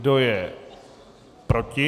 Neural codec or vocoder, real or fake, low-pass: none; real; 14.4 kHz